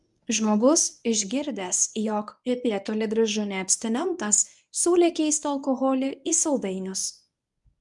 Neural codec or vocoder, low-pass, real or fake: codec, 24 kHz, 0.9 kbps, WavTokenizer, medium speech release version 1; 10.8 kHz; fake